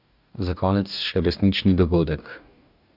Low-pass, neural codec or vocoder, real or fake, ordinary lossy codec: 5.4 kHz; codec, 44.1 kHz, 2.6 kbps, DAC; fake; none